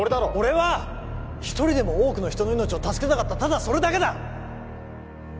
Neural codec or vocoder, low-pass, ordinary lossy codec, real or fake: none; none; none; real